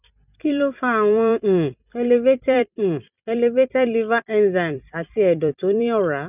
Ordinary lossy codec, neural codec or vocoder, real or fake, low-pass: none; vocoder, 44.1 kHz, 128 mel bands every 256 samples, BigVGAN v2; fake; 3.6 kHz